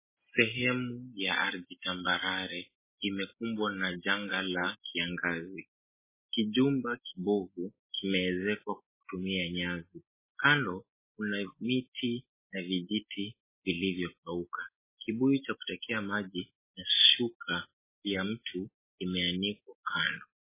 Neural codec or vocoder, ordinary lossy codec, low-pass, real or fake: none; MP3, 16 kbps; 3.6 kHz; real